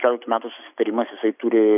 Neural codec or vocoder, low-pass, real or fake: none; 3.6 kHz; real